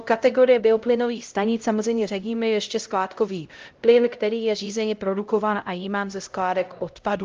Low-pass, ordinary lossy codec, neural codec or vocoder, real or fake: 7.2 kHz; Opus, 32 kbps; codec, 16 kHz, 0.5 kbps, X-Codec, HuBERT features, trained on LibriSpeech; fake